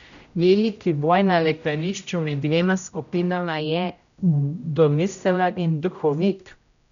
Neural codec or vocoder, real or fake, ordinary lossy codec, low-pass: codec, 16 kHz, 0.5 kbps, X-Codec, HuBERT features, trained on general audio; fake; none; 7.2 kHz